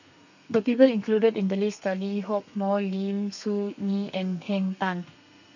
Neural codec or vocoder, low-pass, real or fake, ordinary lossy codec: codec, 32 kHz, 1.9 kbps, SNAC; 7.2 kHz; fake; none